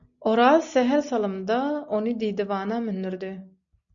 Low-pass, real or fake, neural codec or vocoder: 7.2 kHz; real; none